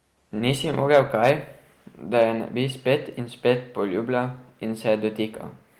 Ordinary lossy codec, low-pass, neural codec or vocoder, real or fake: Opus, 24 kbps; 19.8 kHz; none; real